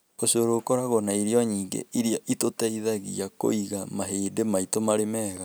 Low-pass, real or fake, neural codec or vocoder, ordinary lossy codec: none; real; none; none